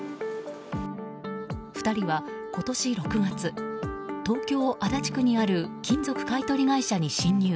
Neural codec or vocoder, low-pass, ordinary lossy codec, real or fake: none; none; none; real